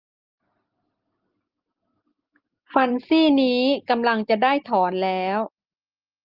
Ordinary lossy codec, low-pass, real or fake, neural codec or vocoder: Opus, 32 kbps; 5.4 kHz; real; none